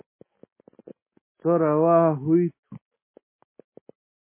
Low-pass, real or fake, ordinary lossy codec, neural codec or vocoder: 3.6 kHz; real; MP3, 16 kbps; none